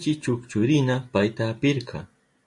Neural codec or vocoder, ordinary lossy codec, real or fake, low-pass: none; MP3, 48 kbps; real; 10.8 kHz